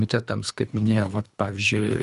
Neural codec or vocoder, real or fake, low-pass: codec, 24 kHz, 3 kbps, HILCodec; fake; 10.8 kHz